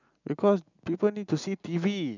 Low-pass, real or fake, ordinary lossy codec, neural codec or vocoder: 7.2 kHz; real; none; none